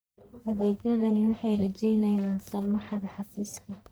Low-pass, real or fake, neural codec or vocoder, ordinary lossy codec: none; fake; codec, 44.1 kHz, 1.7 kbps, Pupu-Codec; none